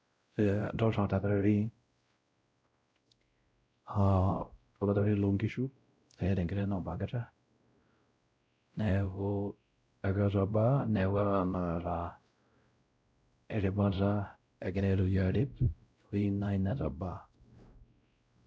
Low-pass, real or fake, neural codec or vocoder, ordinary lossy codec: none; fake; codec, 16 kHz, 0.5 kbps, X-Codec, WavLM features, trained on Multilingual LibriSpeech; none